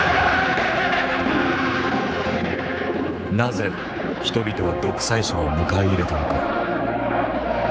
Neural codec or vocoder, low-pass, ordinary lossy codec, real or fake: codec, 16 kHz, 4 kbps, X-Codec, HuBERT features, trained on general audio; none; none; fake